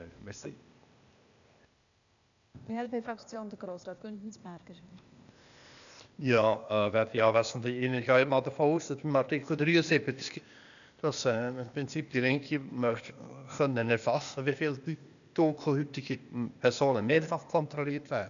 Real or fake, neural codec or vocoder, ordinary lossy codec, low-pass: fake; codec, 16 kHz, 0.8 kbps, ZipCodec; none; 7.2 kHz